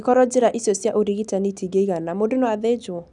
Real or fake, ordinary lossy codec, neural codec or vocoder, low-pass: real; none; none; 10.8 kHz